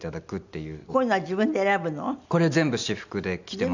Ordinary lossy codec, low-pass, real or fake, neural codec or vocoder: none; 7.2 kHz; real; none